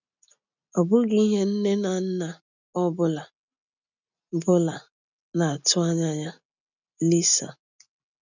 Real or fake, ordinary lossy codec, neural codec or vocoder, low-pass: real; none; none; 7.2 kHz